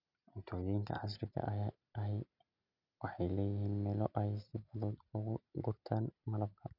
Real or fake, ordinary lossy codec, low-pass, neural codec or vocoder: real; none; 5.4 kHz; none